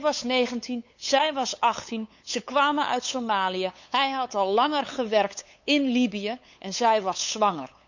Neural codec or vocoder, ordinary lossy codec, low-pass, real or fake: codec, 16 kHz, 8 kbps, FunCodec, trained on LibriTTS, 25 frames a second; none; 7.2 kHz; fake